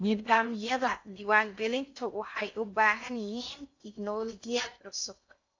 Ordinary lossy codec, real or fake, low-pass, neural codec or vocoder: AAC, 48 kbps; fake; 7.2 kHz; codec, 16 kHz in and 24 kHz out, 0.6 kbps, FocalCodec, streaming, 2048 codes